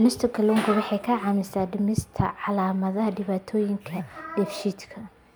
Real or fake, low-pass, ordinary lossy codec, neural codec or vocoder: real; none; none; none